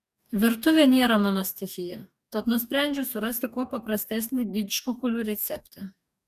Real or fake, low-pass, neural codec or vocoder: fake; 14.4 kHz; codec, 44.1 kHz, 2.6 kbps, DAC